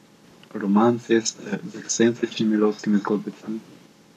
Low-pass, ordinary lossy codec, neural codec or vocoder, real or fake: 14.4 kHz; none; codec, 44.1 kHz, 7.8 kbps, Pupu-Codec; fake